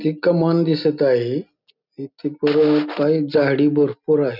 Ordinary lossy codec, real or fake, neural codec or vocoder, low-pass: none; real; none; 5.4 kHz